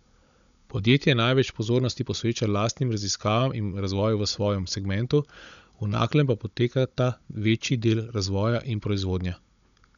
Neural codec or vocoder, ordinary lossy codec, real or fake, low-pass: codec, 16 kHz, 16 kbps, FunCodec, trained on Chinese and English, 50 frames a second; none; fake; 7.2 kHz